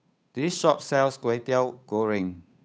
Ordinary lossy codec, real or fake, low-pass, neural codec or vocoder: none; fake; none; codec, 16 kHz, 8 kbps, FunCodec, trained on Chinese and English, 25 frames a second